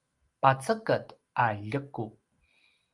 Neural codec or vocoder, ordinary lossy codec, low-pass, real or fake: none; Opus, 32 kbps; 10.8 kHz; real